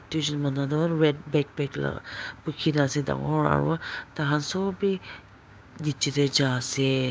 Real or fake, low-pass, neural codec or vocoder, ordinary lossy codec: fake; none; codec, 16 kHz, 6 kbps, DAC; none